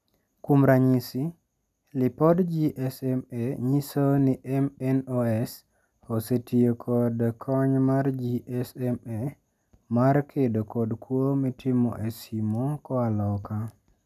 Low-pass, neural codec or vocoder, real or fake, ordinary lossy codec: 14.4 kHz; none; real; none